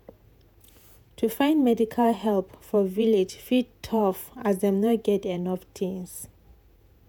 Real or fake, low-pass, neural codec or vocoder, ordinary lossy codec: fake; none; vocoder, 48 kHz, 128 mel bands, Vocos; none